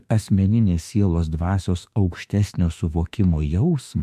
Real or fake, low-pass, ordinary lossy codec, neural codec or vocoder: fake; 14.4 kHz; MP3, 96 kbps; autoencoder, 48 kHz, 32 numbers a frame, DAC-VAE, trained on Japanese speech